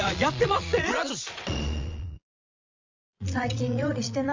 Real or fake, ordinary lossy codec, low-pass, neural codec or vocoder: fake; MP3, 48 kbps; 7.2 kHz; vocoder, 22.05 kHz, 80 mel bands, Vocos